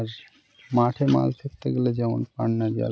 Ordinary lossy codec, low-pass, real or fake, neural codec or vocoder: none; none; real; none